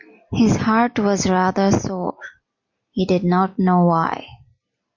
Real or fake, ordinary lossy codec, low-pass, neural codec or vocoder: real; MP3, 48 kbps; 7.2 kHz; none